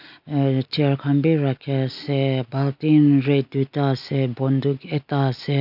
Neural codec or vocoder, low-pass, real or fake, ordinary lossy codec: none; 5.4 kHz; real; none